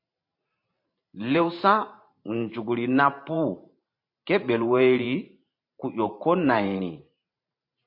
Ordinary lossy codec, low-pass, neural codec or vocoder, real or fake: MP3, 32 kbps; 5.4 kHz; vocoder, 44.1 kHz, 128 mel bands every 512 samples, BigVGAN v2; fake